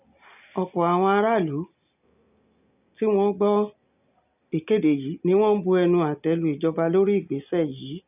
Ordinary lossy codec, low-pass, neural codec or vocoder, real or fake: none; 3.6 kHz; none; real